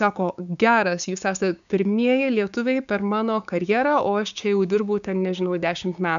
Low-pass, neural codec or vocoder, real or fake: 7.2 kHz; codec, 16 kHz, 6 kbps, DAC; fake